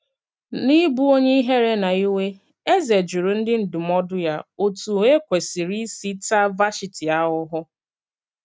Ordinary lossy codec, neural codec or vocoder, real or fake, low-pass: none; none; real; none